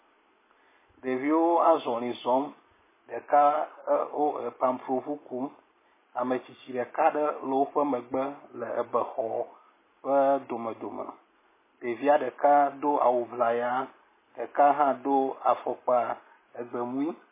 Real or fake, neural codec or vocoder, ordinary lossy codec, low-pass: real; none; MP3, 16 kbps; 3.6 kHz